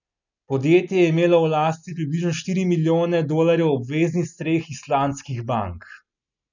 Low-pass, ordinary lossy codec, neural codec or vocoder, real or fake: 7.2 kHz; none; none; real